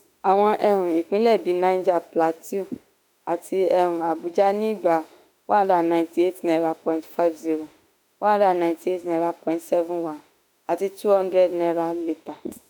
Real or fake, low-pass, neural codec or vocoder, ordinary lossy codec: fake; none; autoencoder, 48 kHz, 32 numbers a frame, DAC-VAE, trained on Japanese speech; none